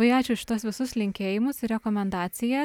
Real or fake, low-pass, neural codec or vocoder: real; 19.8 kHz; none